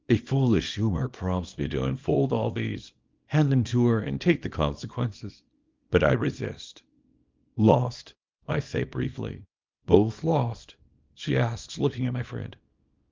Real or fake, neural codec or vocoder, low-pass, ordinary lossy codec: fake; codec, 24 kHz, 0.9 kbps, WavTokenizer, small release; 7.2 kHz; Opus, 32 kbps